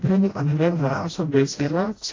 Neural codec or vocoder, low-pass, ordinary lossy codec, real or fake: codec, 16 kHz, 0.5 kbps, FreqCodec, smaller model; 7.2 kHz; AAC, 32 kbps; fake